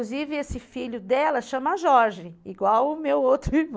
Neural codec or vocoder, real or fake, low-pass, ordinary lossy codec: none; real; none; none